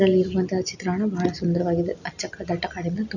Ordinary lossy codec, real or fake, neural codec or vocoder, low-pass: none; real; none; 7.2 kHz